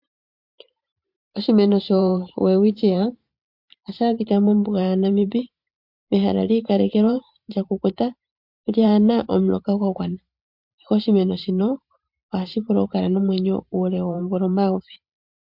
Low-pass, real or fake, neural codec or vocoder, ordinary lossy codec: 5.4 kHz; fake; vocoder, 44.1 kHz, 128 mel bands every 512 samples, BigVGAN v2; MP3, 48 kbps